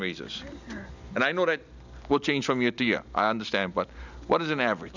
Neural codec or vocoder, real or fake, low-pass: none; real; 7.2 kHz